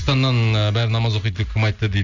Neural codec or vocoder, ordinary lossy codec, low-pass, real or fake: none; none; 7.2 kHz; real